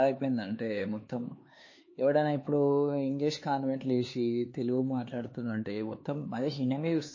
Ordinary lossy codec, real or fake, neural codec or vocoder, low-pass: MP3, 32 kbps; fake; codec, 16 kHz, 4 kbps, X-Codec, HuBERT features, trained on LibriSpeech; 7.2 kHz